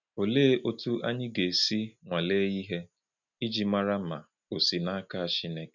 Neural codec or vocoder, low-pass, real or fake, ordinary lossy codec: none; 7.2 kHz; real; none